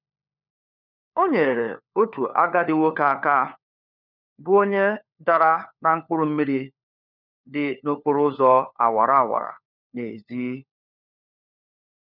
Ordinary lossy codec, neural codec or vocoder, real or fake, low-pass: none; codec, 16 kHz, 4 kbps, FunCodec, trained on LibriTTS, 50 frames a second; fake; 5.4 kHz